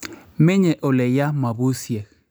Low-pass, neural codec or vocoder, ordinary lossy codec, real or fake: none; none; none; real